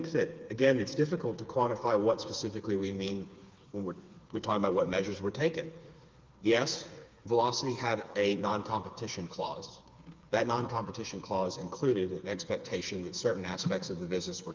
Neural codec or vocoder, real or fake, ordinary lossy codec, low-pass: codec, 16 kHz, 4 kbps, FreqCodec, smaller model; fake; Opus, 32 kbps; 7.2 kHz